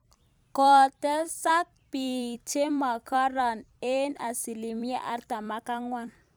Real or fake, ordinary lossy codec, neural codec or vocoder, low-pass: fake; none; vocoder, 44.1 kHz, 128 mel bands every 256 samples, BigVGAN v2; none